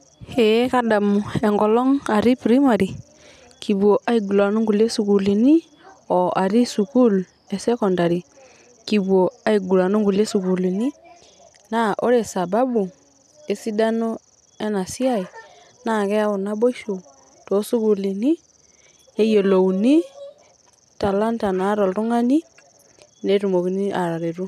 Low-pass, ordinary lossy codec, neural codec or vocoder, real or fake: 14.4 kHz; none; none; real